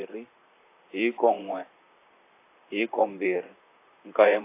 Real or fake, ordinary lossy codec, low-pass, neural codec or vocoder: fake; AAC, 24 kbps; 3.6 kHz; vocoder, 44.1 kHz, 128 mel bands, Pupu-Vocoder